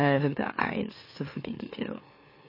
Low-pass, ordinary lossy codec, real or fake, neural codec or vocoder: 5.4 kHz; MP3, 24 kbps; fake; autoencoder, 44.1 kHz, a latent of 192 numbers a frame, MeloTTS